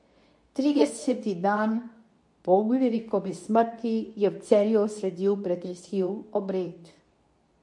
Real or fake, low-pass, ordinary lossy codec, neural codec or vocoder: fake; 10.8 kHz; MP3, 48 kbps; codec, 24 kHz, 0.9 kbps, WavTokenizer, medium speech release version 2